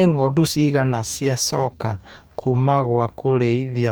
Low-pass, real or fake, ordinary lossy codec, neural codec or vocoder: none; fake; none; codec, 44.1 kHz, 2.6 kbps, DAC